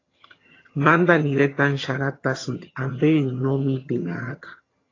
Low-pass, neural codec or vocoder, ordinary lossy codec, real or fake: 7.2 kHz; vocoder, 22.05 kHz, 80 mel bands, HiFi-GAN; AAC, 32 kbps; fake